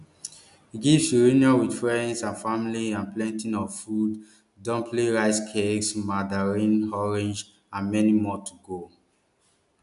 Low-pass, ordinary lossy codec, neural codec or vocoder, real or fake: 10.8 kHz; none; none; real